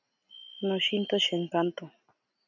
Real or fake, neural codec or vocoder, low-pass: real; none; 7.2 kHz